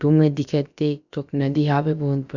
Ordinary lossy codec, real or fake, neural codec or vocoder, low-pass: none; fake; codec, 16 kHz, about 1 kbps, DyCAST, with the encoder's durations; 7.2 kHz